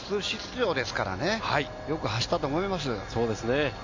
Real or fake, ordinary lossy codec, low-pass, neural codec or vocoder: real; MP3, 48 kbps; 7.2 kHz; none